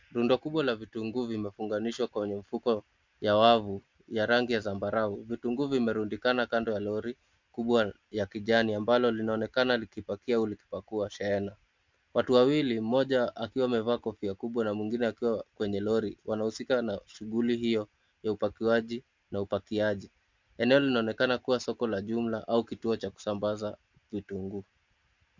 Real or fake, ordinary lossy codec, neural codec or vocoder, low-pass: real; MP3, 64 kbps; none; 7.2 kHz